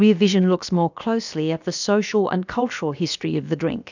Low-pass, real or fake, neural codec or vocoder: 7.2 kHz; fake; codec, 16 kHz, about 1 kbps, DyCAST, with the encoder's durations